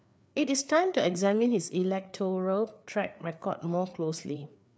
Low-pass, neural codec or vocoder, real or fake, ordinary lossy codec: none; codec, 16 kHz, 4 kbps, FreqCodec, larger model; fake; none